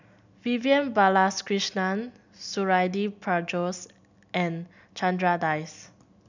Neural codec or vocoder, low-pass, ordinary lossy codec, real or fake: none; 7.2 kHz; none; real